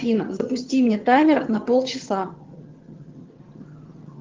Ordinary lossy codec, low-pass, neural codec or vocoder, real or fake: Opus, 16 kbps; 7.2 kHz; vocoder, 22.05 kHz, 80 mel bands, HiFi-GAN; fake